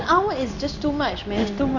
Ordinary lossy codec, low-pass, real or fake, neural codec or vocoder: none; 7.2 kHz; fake; codec, 16 kHz in and 24 kHz out, 1 kbps, XY-Tokenizer